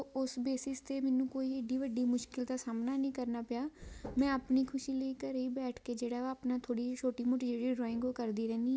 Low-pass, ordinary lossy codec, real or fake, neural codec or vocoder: none; none; real; none